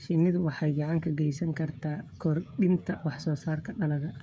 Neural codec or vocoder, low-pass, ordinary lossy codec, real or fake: codec, 16 kHz, 16 kbps, FreqCodec, smaller model; none; none; fake